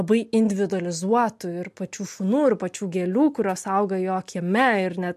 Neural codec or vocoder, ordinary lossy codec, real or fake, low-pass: none; MP3, 64 kbps; real; 14.4 kHz